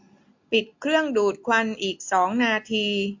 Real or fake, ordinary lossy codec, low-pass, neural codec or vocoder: real; MP3, 48 kbps; 7.2 kHz; none